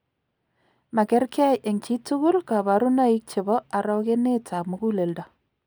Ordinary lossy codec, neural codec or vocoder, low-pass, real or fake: none; none; none; real